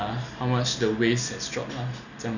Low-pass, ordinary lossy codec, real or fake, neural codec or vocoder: 7.2 kHz; none; real; none